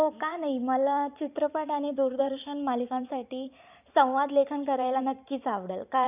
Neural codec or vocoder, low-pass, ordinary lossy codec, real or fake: vocoder, 44.1 kHz, 128 mel bands every 512 samples, BigVGAN v2; 3.6 kHz; none; fake